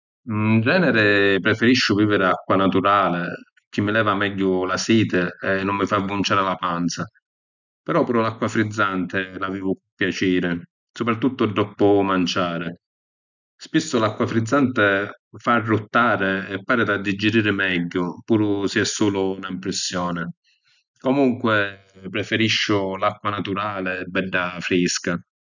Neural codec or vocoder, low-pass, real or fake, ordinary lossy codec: none; 7.2 kHz; real; none